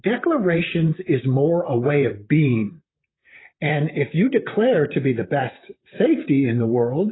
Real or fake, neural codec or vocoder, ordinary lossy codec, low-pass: fake; vocoder, 44.1 kHz, 128 mel bands, Pupu-Vocoder; AAC, 16 kbps; 7.2 kHz